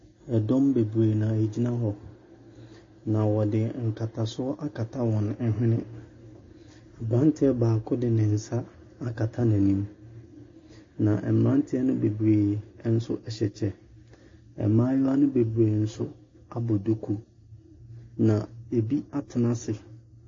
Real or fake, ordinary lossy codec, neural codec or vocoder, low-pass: real; MP3, 32 kbps; none; 7.2 kHz